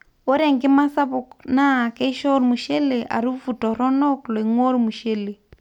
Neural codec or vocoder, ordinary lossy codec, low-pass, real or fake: none; none; 19.8 kHz; real